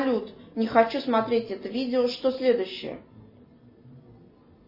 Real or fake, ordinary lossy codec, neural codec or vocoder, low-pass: real; MP3, 24 kbps; none; 5.4 kHz